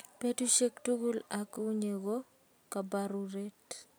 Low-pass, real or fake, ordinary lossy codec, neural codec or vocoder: none; real; none; none